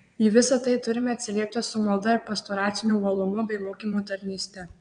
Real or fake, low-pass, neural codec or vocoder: fake; 9.9 kHz; vocoder, 22.05 kHz, 80 mel bands, WaveNeXt